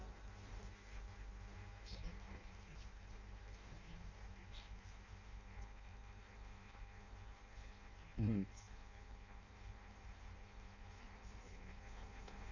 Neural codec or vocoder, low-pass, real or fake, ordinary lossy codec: codec, 16 kHz in and 24 kHz out, 0.6 kbps, FireRedTTS-2 codec; 7.2 kHz; fake; none